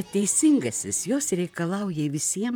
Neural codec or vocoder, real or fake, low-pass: vocoder, 44.1 kHz, 128 mel bands, Pupu-Vocoder; fake; 19.8 kHz